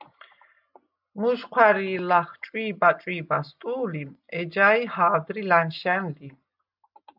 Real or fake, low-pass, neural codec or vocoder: real; 5.4 kHz; none